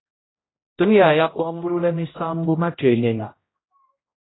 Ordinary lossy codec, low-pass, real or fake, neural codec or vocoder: AAC, 16 kbps; 7.2 kHz; fake; codec, 16 kHz, 0.5 kbps, X-Codec, HuBERT features, trained on general audio